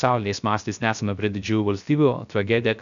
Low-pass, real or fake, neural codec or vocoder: 7.2 kHz; fake; codec, 16 kHz, 0.3 kbps, FocalCodec